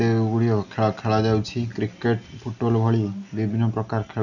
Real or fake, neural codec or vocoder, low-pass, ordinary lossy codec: real; none; 7.2 kHz; none